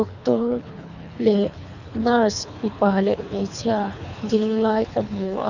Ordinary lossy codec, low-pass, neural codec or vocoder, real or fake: none; 7.2 kHz; codec, 24 kHz, 3 kbps, HILCodec; fake